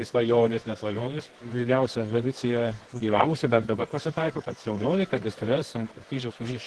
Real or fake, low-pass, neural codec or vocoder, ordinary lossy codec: fake; 10.8 kHz; codec, 24 kHz, 0.9 kbps, WavTokenizer, medium music audio release; Opus, 16 kbps